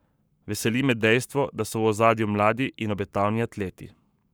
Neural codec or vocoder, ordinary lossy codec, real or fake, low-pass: codec, 44.1 kHz, 7.8 kbps, Pupu-Codec; none; fake; none